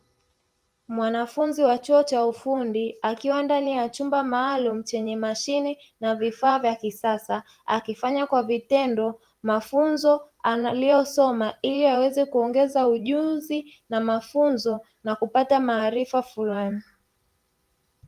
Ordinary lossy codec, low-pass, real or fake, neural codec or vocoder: Opus, 32 kbps; 14.4 kHz; fake; vocoder, 44.1 kHz, 128 mel bands every 512 samples, BigVGAN v2